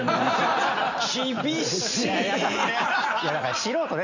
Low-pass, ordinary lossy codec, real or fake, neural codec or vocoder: 7.2 kHz; none; real; none